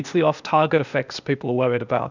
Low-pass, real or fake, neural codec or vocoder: 7.2 kHz; fake; codec, 16 kHz, 0.8 kbps, ZipCodec